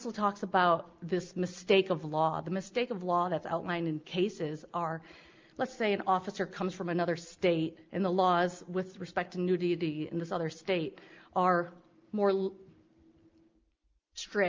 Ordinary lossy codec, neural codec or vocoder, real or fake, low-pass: Opus, 24 kbps; none; real; 7.2 kHz